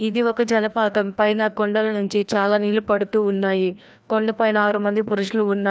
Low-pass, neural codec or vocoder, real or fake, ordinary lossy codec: none; codec, 16 kHz, 1 kbps, FreqCodec, larger model; fake; none